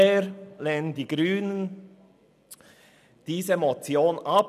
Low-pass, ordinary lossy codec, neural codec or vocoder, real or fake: 14.4 kHz; none; none; real